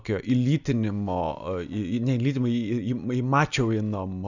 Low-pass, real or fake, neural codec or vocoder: 7.2 kHz; real; none